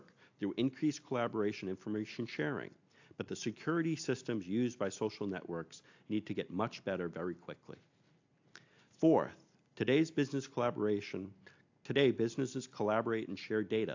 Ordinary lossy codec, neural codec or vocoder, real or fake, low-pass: AAC, 48 kbps; none; real; 7.2 kHz